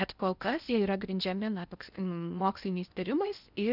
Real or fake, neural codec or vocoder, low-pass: fake; codec, 16 kHz in and 24 kHz out, 0.8 kbps, FocalCodec, streaming, 65536 codes; 5.4 kHz